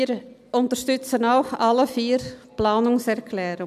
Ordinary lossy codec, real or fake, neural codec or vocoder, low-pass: none; real; none; 14.4 kHz